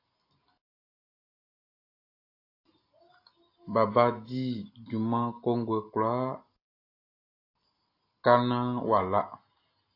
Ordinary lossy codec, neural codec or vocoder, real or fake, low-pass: AAC, 32 kbps; none; real; 5.4 kHz